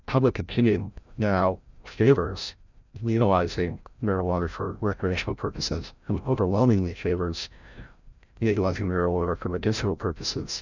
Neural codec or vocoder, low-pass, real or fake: codec, 16 kHz, 0.5 kbps, FreqCodec, larger model; 7.2 kHz; fake